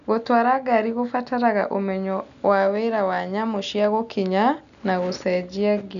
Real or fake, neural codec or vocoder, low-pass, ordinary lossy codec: real; none; 7.2 kHz; none